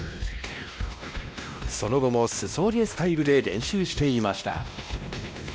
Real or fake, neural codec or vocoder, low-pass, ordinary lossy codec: fake; codec, 16 kHz, 1 kbps, X-Codec, WavLM features, trained on Multilingual LibriSpeech; none; none